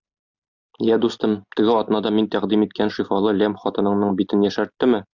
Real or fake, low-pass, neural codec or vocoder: real; 7.2 kHz; none